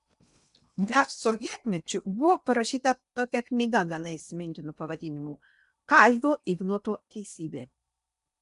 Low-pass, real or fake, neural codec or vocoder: 10.8 kHz; fake; codec, 16 kHz in and 24 kHz out, 0.8 kbps, FocalCodec, streaming, 65536 codes